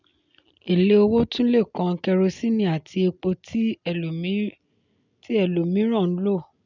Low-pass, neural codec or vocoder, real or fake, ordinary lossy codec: 7.2 kHz; none; real; none